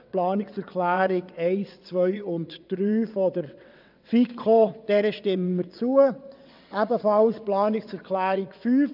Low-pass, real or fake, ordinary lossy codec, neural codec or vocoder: 5.4 kHz; fake; none; vocoder, 22.05 kHz, 80 mel bands, WaveNeXt